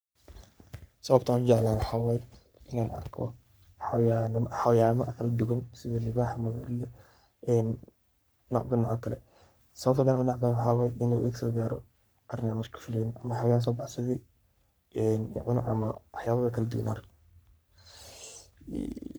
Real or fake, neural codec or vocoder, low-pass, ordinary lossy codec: fake; codec, 44.1 kHz, 3.4 kbps, Pupu-Codec; none; none